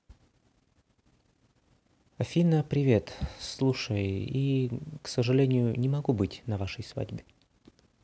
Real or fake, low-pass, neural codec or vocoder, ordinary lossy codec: real; none; none; none